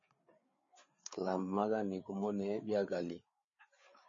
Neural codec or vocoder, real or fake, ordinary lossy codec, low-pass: codec, 16 kHz, 4 kbps, FreqCodec, larger model; fake; MP3, 32 kbps; 7.2 kHz